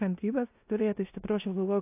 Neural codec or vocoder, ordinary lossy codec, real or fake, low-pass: codec, 16 kHz in and 24 kHz out, 0.9 kbps, LongCat-Audio-Codec, four codebook decoder; AAC, 24 kbps; fake; 3.6 kHz